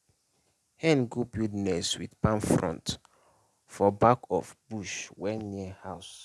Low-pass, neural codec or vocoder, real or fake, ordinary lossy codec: none; none; real; none